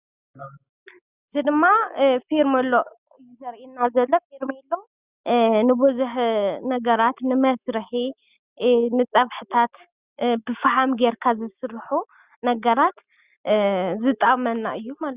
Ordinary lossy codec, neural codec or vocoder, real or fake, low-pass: Opus, 64 kbps; none; real; 3.6 kHz